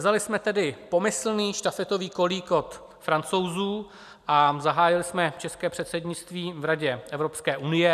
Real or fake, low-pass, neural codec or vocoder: real; 14.4 kHz; none